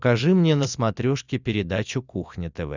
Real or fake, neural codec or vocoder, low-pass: real; none; 7.2 kHz